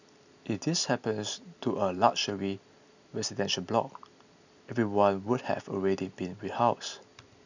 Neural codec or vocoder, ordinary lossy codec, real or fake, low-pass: none; none; real; 7.2 kHz